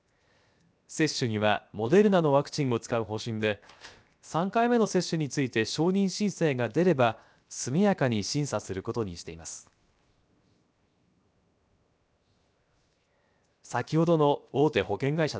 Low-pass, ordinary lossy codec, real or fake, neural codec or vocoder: none; none; fake; codec, 16 kHz, 0.7 kbps, FocalCodec